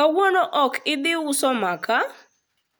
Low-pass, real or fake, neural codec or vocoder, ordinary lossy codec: none; real; none; none